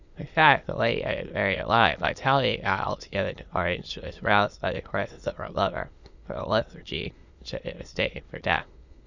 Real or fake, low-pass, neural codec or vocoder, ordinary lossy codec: fake; 7.2 kHz; autoencoder, 22.05 kHz, a latent of 192 numbers a frame, VITS, trained on many speakers; Opus, 64 kbps